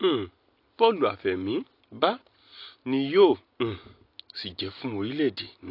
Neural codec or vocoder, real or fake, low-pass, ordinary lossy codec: none; real; 5.4 kHz; AAC, 32 kbps